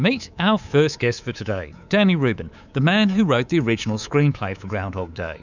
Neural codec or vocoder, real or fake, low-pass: codec, 24 kHz, 3.1 kbps, DualCodec; fake; 7.2 kHz